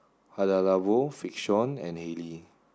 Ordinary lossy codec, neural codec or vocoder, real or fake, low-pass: none; none; real; none